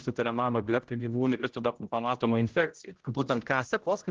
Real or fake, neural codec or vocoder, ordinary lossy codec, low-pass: fake; codec, 16 kHz, 0.5 kbps, X-Codec, HuBERT features, trained on general audio; Opus, 16 kbps; 7.2 kHz